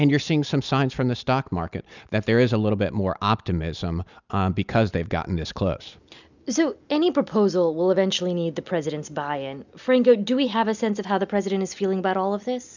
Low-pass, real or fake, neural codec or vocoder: 7.2 kHz; real; none